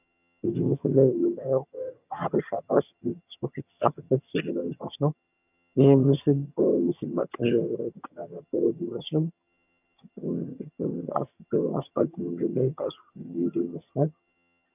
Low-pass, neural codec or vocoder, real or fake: 3.6 kHz; vocoder, 22.05 kHz, 80 mel bands, HiFi-GAN; fake